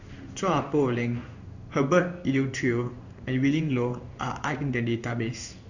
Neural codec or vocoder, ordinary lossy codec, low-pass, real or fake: codec, 16 kHz in and 24 kHz out, 1 kbps, XY-Tokenizer; Opus, 64 kbps; 7.2 kHz; fake